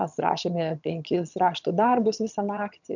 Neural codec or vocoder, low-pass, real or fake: vocoder, 22.05 kHz, 80 mel bands, HiFi-GAN; 7.2 kHz; fake